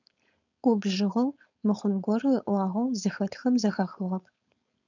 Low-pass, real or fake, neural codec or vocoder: 7.2 kHz; fake; codec, 16 kHz, 4.8 kbps, FACodec